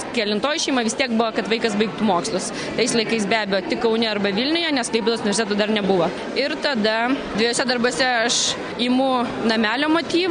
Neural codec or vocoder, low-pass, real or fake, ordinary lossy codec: none; 9.9 kHz; real; MP3, 96 kbps